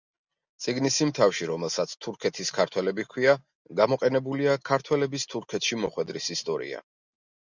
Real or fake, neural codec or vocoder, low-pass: real; none; 7.2 kHz